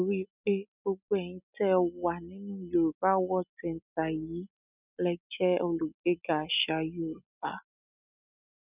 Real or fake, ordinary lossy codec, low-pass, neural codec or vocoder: real; none; 3.6 kHz; none